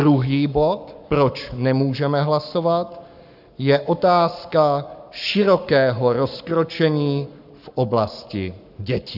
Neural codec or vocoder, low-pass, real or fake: codec, 44.1 kHz, 7.8 kbps, Pupu-Codec; 5.4 kHz; fake